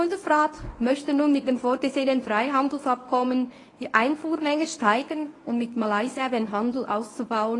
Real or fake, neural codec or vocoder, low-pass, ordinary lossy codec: fake; codec, 24 kHz, 0.9 kbps, WavTokenizer, medium speech release version 1; 10.8 kHz; AAC, 32 kbps